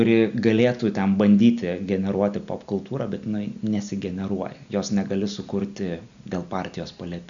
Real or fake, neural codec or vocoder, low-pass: real; none; 7.2 kHz